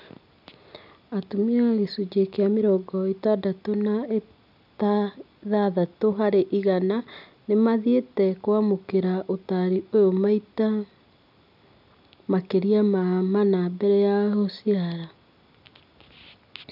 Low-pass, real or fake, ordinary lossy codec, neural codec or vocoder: 5.4 kHz; real; none; none